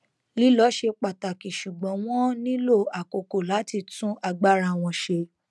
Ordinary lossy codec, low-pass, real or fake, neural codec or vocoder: none; none; real; none